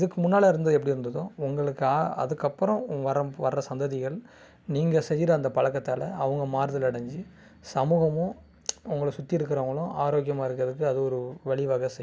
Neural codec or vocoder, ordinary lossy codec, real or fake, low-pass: none; none; real; none